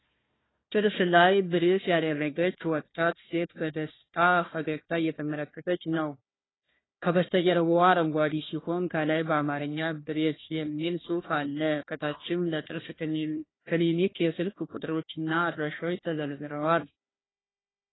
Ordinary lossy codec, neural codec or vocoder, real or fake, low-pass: AAC, 16 kbps; codec, 16 kHz, 1 kbps, FunCodec, trained on Chinese and English, 50 frames a second; fake; 7.2 kHz